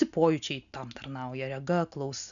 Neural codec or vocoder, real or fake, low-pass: none; real; 7.2 kHz